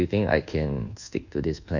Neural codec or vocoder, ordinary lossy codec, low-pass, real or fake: codec, 24 kHz, 1.2 kbps, DualCodec; none; 7.2 kHz; fake